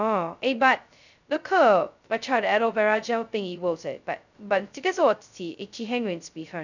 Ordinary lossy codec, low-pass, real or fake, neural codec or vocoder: none; 7.2 kHz; fake; codec, 16 kHz, 0.2 kbps, FocalCodec